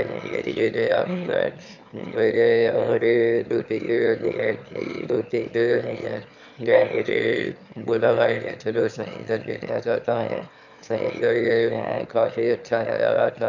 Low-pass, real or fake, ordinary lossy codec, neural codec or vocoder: 7.2 kHz; fake; none; autoencoder, 22.05 kHz, a latent of 192 numbers a frame, VITS, trained on one speaker